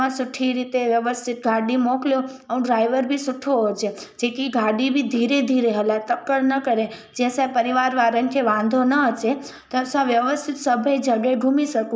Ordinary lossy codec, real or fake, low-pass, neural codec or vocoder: none; real; none; none